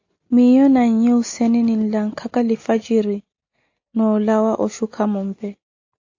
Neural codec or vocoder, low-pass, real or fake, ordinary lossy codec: none; 7.2 kHz; real; AAC, 48 kbps